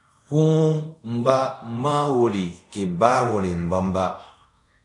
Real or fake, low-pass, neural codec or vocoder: fake; 10.8 kHz; codec, 24 kHz, 0.5 kbps, DualCodec